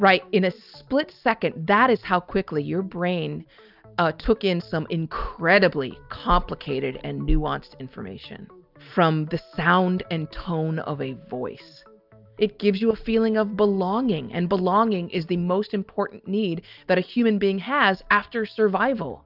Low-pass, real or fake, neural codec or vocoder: 5.4 kHz; real; none